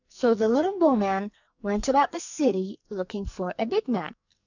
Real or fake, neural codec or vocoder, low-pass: fake; codec, 44.1 kHz, 2.6 kbps, SNAC; 7.2 kHz